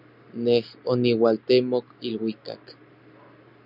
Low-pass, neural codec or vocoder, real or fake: 5.4 kHz; none; real